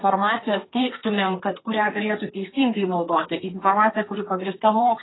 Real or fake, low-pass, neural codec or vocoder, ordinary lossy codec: fake; 7.2 kHz; codec, 32 kHz, 1.9 kbps, SNAC; AAC, 16 kbps